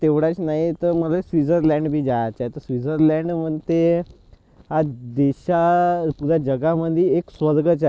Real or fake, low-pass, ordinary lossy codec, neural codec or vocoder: real; none; none; none